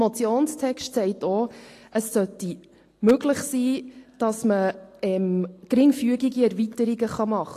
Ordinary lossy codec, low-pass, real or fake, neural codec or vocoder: AAC, 48 kbps; 14.4 kHz; real; none